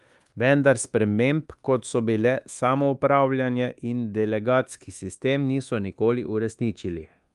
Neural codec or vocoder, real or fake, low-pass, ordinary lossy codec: codec, 24 kHz, 1.2 kbps, DualCodec; fake; 10.8 kHz; Opus, 32 kbps